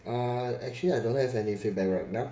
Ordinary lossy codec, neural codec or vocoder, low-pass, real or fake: none; codec, 16 kHz, 16 kbps, FreqCodec, smaller model; none; fake